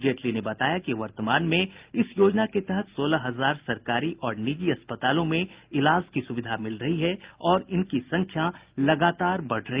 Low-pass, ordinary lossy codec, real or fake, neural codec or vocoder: 3.6 kHz; Opus, 32 kbps; real; none